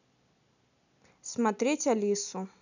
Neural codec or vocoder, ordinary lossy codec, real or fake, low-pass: none; none; real; 7.2 kHz